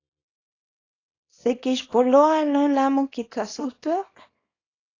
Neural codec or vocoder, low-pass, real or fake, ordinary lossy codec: codec, 24 kHz, 0.9 kbps, WavTokenizer, small release; 7.2 kHz; fake; AAC, 32 kbps